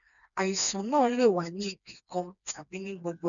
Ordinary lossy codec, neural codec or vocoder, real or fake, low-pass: none; codec, 16 kHz, 2 kbps, FreqCodec, smaller model; fake; 7.2 kHz